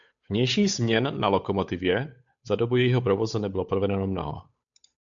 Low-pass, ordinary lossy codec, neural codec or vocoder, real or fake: 7.2 kHz; MP3, 64 kbps; codec, 16 kHz, 8 kbps, FunCodec, trained on Chinese and English, 25 frames a second; fake